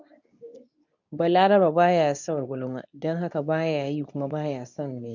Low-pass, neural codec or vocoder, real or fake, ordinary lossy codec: 7.2 kHz; codec, 24 kHz, 0.9 kbps, WavTokenizer, medium speech release version 2; fake; none